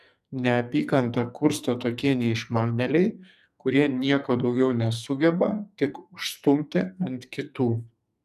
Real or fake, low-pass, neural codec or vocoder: fake; 14.4 kHz; codec, 44.1 kHz, 2.6 kbps, SNAC